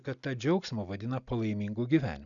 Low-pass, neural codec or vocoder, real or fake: 7.2 kHz; none; real